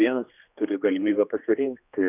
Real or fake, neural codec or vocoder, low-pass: fake; codec, 16 kHz, 2 kbps, X-Codec, HuBERT features, trained on general audio; 3.6 kHz